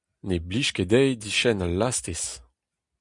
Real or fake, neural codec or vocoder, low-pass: real; none; 10.8 kHz